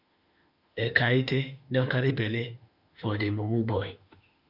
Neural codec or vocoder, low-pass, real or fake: autoencoder, 48 kHz, 32 numbers a frame, DAC-VAE, trained on Japanese speech; 5.4 kHz; fake